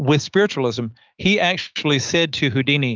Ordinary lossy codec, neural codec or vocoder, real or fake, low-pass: Opus, 32 kbps; autoencoder, 48 kHz, 128 numbers a frame, DAC-VAE, trained on Japanese speech; fake; 7.2 kHz